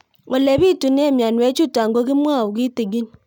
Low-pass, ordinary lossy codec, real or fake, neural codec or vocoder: 19.8 kHz; none; real; none